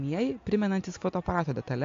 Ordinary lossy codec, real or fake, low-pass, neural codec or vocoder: AAC, 48 kbps; real; 7.2 kHz; none